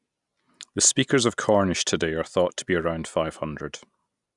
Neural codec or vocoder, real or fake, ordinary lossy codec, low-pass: none; real; none; 10.8 kHz